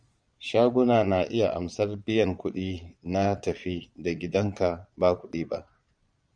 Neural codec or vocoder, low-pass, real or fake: vocoder, 22.05 kHz, 80 mel bands, Vocos; 9.9 kHz; fake